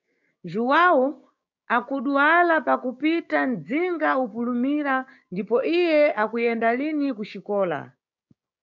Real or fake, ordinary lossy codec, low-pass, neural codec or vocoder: fake; MP3, 64 kbps; 7.2 kHz; codec, 16 kHz, 6 kbps, DAC